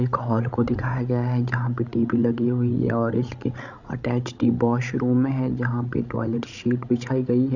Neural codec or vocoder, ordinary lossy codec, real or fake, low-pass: codec, 16 kHz, 16 kbps, FreqCodec, larger model; none; fake; 7.2 kHz